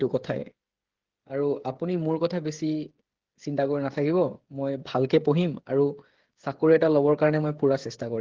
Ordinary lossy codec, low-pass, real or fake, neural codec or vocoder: Opus, 16 kbps; 7.2 kHz; fake; codec, 16 kHz, 8 kbps, FreqCodec, smaller model